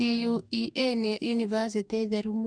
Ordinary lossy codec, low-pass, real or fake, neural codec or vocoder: none; 9.9 kHz; fake; codec, 44.1 kHz, 2.6 kbps, DAC